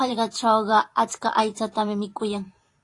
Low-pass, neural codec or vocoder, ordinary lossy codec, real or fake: 10.8 kHz; vocoder, 44.1 kHz, 128 mel bands every 512 samples, BigVGAN v2; AAC, 48 kbps; fake